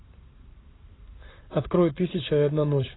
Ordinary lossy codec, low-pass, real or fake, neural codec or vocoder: AAC, 16 kbps; 7.2 kHz; fake; vocoder, 44.1 kHz, 128 mel bands every 256 samples, BigVGAN v2